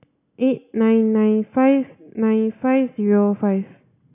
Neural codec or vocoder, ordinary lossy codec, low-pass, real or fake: none; none; 3.6 kHz; real